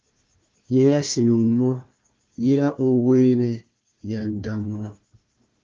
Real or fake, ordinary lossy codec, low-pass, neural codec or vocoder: fake; Opus, 24 kbps; 7.2 kHz; codec, 16 kHz, 1 kbps, FunCodec, trained on Chinese and English, 50 frames a second